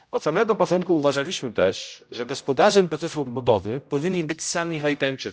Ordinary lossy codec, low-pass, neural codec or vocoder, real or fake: none; none; codec, 16 kHz, 0.5 kbps, X-Codec, HuBERT features, trained on general audio; fake